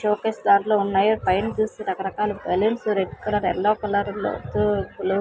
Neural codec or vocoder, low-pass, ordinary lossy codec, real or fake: none; none; none; real